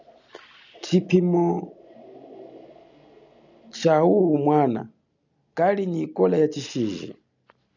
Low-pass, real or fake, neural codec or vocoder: 7.2 kHz; real; none